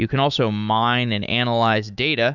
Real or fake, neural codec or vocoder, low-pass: fake; autoencoder, 48 kHz, 128 numbers a frame, DAC-VAE, trained on Japanese speech; 7.2 kHz